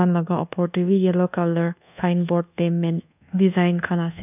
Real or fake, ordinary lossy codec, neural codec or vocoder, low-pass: fake; none; codec, 24 kHz, 1.2 kbps, DualCodec; 3.6 kHz